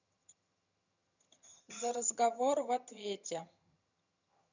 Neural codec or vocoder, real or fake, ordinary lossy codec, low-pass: vocoder, 22.05 kHz, 80 mel bands, HiFi-GAN; fake; none; 7.2 kHz